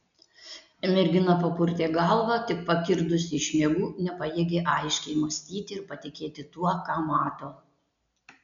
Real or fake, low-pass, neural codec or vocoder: real; 7.2 kHz; none